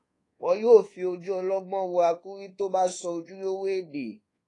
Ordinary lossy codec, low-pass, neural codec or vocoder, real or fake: AAC, 32 kbps; 10.8 kHz; codec, 24 kHz, 1.2 kbps, DualCodec; fake